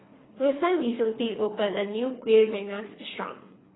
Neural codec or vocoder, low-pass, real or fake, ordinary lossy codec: codec, 24 kHz, 3 kbps, HILCodec; 7.2 kHz; fake; AAC, 16 kbps